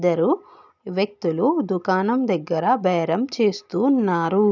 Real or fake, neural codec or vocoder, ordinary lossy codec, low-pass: real; none; none; 7.2 kHz